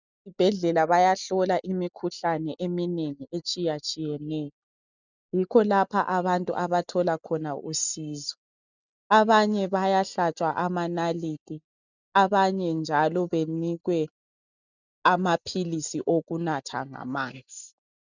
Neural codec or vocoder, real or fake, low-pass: none; real; 7.2 kHz